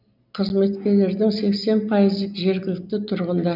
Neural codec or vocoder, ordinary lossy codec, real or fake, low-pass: none; none; real; 5.4 kHz